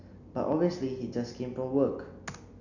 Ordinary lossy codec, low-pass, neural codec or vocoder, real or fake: none; 7.2 kHz; none; real